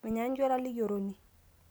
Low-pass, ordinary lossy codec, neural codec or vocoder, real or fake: none; none; none; real